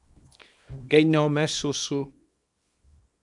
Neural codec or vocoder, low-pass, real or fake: codec, 24 kHz, 0.9 kbps, WavTokenizer, small release; 10.8 kHz; fake